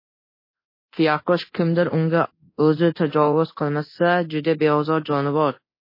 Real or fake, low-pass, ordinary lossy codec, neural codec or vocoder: fake; 5.4 kHz; MP3, 24 kbps; codec, 24 kHz, 0.9 kbps, DualCodec